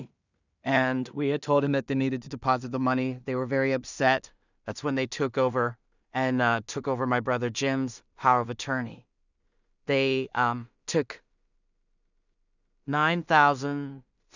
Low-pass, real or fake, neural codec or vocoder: 7.2 kHz; fake; codec, 16 kHz in and 24 kHz out, 0.4 kbps, LongCat-Audio-Codec, two codebook decoder